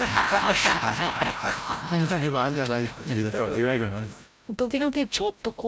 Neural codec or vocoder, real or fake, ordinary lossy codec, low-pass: codec, 16 kHz, 0.5 kbps, FreqCodec, larger model; fake; none; none